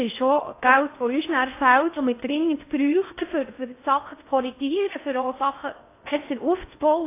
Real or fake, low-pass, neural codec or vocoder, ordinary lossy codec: fake; 3.6 kHz; codec, 16 kHz in and 24 kHz out, 0.6 kbps, FocalCodec, streaming, 2048 codes; AAC, 24 kbps